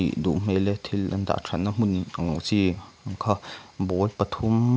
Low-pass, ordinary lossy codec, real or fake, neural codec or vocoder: none; none; real; none